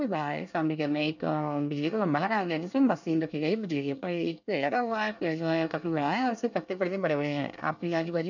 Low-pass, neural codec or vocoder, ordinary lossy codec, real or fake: 7.2 kHz; codec, 24 kHz, 1 kbps, SNAC; none; fake